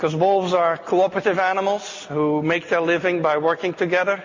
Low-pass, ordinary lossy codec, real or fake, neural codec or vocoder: 7.2 kHz; MP3, 32 kbps; real; none